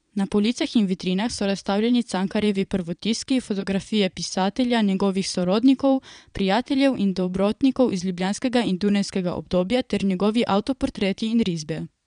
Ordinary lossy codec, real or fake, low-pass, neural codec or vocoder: none; fake; 9.9 kHz; vocoder, 22.05 kHz, 80 mel bands, Vocos